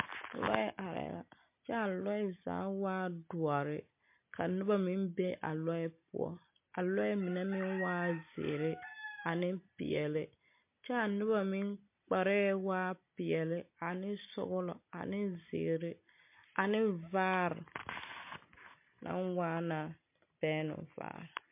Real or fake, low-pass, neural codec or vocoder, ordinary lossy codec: real; 3.6 kHz; none; MP3, 24 kbps